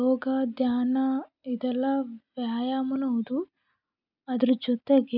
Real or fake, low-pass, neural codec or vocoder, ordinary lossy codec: real; 5.4 kHz; none; none